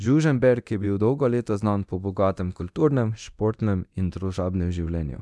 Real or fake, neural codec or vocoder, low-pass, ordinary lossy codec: fake; codec, 24 kHz, 0.9 kbps, DualCodec; none; none